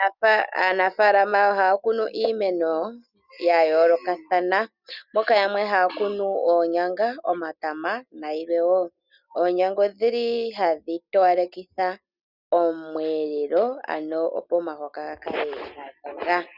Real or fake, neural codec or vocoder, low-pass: real; none; 5.4 kHz